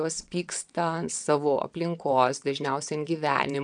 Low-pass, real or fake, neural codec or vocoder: 9.9 kHz; fake; vocoder, 22.05 kHz, 80 mel bands, WaveNeXt